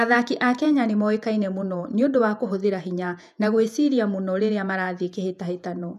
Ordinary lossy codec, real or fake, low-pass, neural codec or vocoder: none; fake; 14.4 kHz; vocoder, 48 kHz, 128 mel bands, Vocos